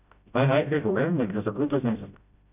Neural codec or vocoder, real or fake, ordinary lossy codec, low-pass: codec, 16 kHz, 0.5 kbps, FreqCodec, smaller model; fake; none; 3.6 kHz